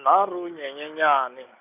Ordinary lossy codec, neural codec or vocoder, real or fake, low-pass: none; none; real; 3.6 kHz